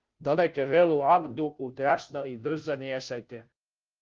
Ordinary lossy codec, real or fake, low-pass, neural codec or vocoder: Opus, 32 kbps; fake; 7.2 kHz; codec, 16 kHz, 0.5 kbps, FunCodec, trained on Chinese and English, 25 frames a second